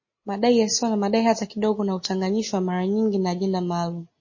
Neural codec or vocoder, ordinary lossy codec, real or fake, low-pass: none; MP3, 32 kbps; real; 7.2 kHz